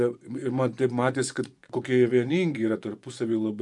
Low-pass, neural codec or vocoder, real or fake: 10.8 kHz; vocoder, 24 kHz, 100 mel bands, Vocos; fake